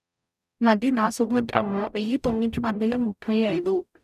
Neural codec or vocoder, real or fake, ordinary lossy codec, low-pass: codec, 44.1 kHz, 0.9 kbps, DAC; fake; none; 14.4 kHz